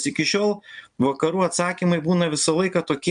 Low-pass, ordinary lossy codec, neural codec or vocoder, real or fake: 9.9 kHz; MP3, 64 kbps; none; real